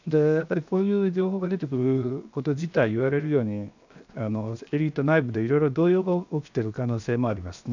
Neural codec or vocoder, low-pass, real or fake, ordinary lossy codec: codec, 16 kHz, 0.7 kbps, FocalCodec; 7.2 kHz; fake; none